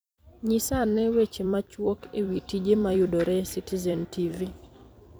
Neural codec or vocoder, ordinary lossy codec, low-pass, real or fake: vocoder, 44.1 kHz, 128 mel bands every 256 samples, BigVGAN v2; none; none; fake